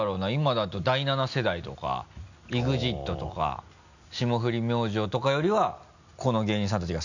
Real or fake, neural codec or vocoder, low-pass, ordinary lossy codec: real; none; 7.2 kHz; none